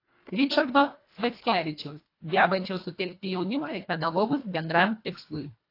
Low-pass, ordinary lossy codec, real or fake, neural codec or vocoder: 5.4 kHz; AAC, 32 kbps; fake; codec, 24 kHz, 1.5 kbps, HILCodec